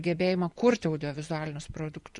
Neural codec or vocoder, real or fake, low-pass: vocoder, 24 kHz, 100 mel bands, Vocos; fake; 10.8 kHz